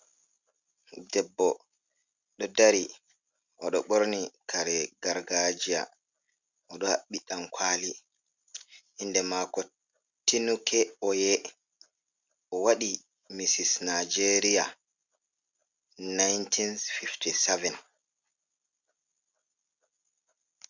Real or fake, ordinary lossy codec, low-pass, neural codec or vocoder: real; Opus, 64 kbps; 7.2 kHz; none